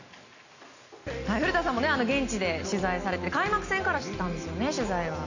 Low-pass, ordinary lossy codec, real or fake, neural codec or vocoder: 7.2 kHz; none; real; none